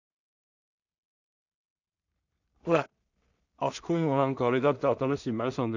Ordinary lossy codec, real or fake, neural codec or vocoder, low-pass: none; fake; codec, 16 kHz in and 24 kHz out, 0.4 kbps, LongCat-Audio-Codec, two codebook decoder; 7.2 kHz